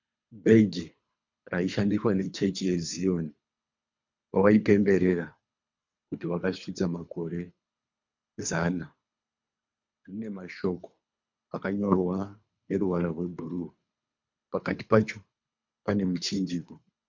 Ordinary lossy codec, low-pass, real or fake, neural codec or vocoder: MP3, 64 kbps; 7.2 kHz; fake; codec, 24 kHz, 3 kbps, HILCodec